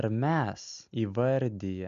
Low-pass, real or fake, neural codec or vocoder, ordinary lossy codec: 7.2 kHz; real; none; AAC, 96 kbps